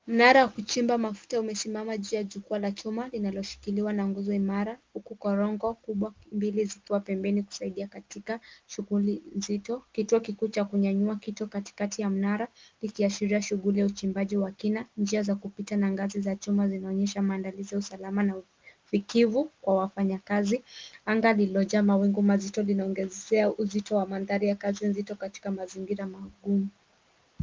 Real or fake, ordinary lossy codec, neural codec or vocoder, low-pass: real; Opus, 32 kbps; none; 7.2 kHz